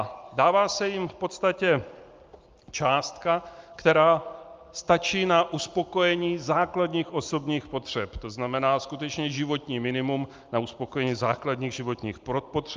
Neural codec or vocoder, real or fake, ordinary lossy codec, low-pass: none; real; Opus, 24 kbps; 7.2 kHz